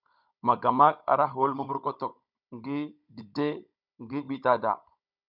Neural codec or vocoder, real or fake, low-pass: codec, 16 kHz, 16 kbps, FunCodec, trained on Chinese and English, 50 frames a second; fake; 5.4 kHz